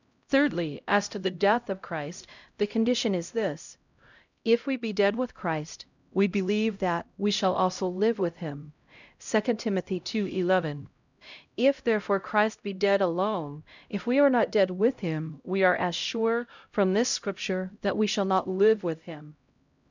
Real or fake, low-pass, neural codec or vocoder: fake; 7.2 kHz; codec, 16 kHz, 0.5 kbps, X-Codec, HuBERT features, trained on LibriSpeech